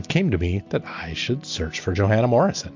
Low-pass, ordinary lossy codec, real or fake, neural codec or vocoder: 7.2 kHz; MP3, 48 kbps; real; none